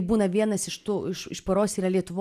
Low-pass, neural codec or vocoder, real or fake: 14.4 kHz; none; real